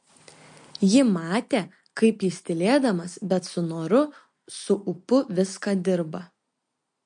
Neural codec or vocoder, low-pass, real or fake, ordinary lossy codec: none; 9.9 kHz; real; MP3, 48 kbps